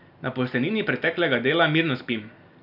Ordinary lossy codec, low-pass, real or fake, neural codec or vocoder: none; 5.4 kHz; real; none